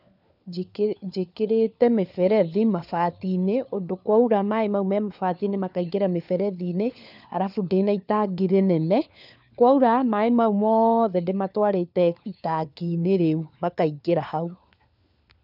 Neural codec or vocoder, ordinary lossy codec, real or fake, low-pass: codec, 16 kHz, 4 kbps, FunCodec, trained on LibriTTS, 50 frames a second; none; fake; 5.4 kHz